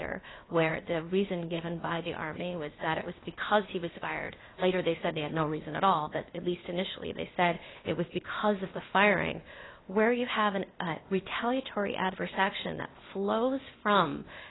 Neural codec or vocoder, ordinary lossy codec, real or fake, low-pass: codec, 16 kHz, 0.8 kbps, ZipCodec; AAC, 16 kbps; fake; 7.2 kHz